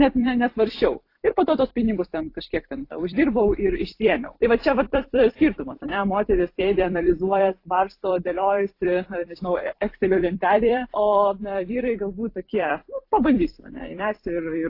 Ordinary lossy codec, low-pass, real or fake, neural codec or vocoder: AAC, 32 kbps; 5.4 kHz; fake; vocoder, 44.1 kHz, 128 mel bands every 256 samples, BigVGAN v2